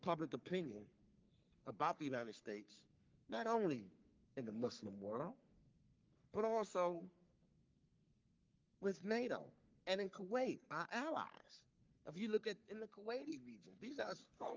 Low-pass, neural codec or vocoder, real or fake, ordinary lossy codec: 7.2 kHz; codec, 44.1 kHz, 3.4 kbps, Pupu-Codec; fake; Opus, 32 kbps